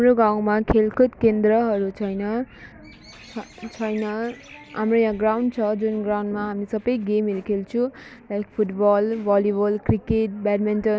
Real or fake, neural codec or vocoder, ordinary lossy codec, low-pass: real; none; none; none